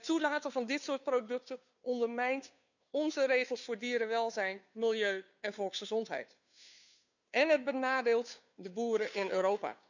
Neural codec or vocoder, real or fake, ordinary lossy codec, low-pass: codec, 16 kHz, 2 kbps, FunCodec, trained on Chinese and English, 25 frames a second; fake; none; 7.2 kHz